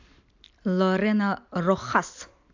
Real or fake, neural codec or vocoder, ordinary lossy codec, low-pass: real; none; AAC, 48 kbps; 7.2 kHz